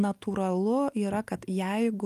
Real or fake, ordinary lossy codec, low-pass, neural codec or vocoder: real; Opus, 32 kbps; 14.4 kHz; none